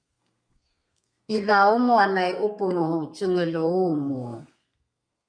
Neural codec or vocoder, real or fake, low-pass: codec, 32 kHz, 1.9 kbps, SNAC; fake; 9.9 kHz